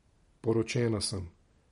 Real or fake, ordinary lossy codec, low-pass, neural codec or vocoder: real; MP3, 48 kbps; 19.8 kHz; none